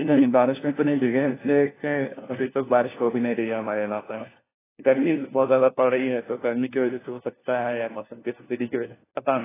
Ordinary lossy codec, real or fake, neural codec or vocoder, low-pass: AAC, 16 kbps; fake; codec, 16 kHz, 1 kbps, FunCodec, trained on LibriTTS, 50 frames a second; 3.6 kHz